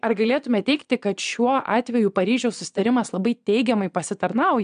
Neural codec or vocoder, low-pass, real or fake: vocoder, 22.05 kHz, 80 mel bands, Vocos; 9.9 kHz; fake